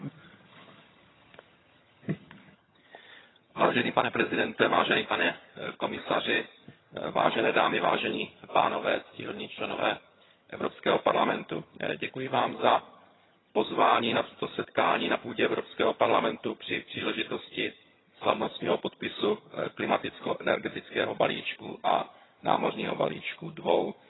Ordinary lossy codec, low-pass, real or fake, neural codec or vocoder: AAC, 16 kbps; 7.2 kHz; fake; vocoder, 22.05 kHz, 80 mel bands, HiFi-GAN